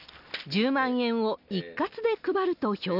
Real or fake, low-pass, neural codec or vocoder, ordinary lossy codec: real; 5.4 kHz; none; none